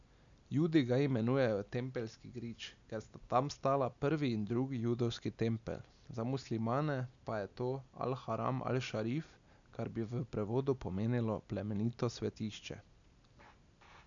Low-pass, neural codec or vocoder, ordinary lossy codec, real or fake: 7.2 kHz; none; MP3, 96 kbps; real